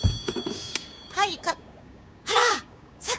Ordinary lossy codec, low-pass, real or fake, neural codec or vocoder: none; none; fake; codec, 16 kHz, 6 kbps, DAC